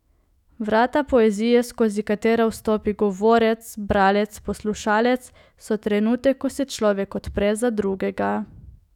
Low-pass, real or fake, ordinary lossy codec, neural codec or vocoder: 19.8 kHz; fake; none; autoencoder, 48 kHz, 128 numbers a frame, DAC-VAE, trained on Japanese speech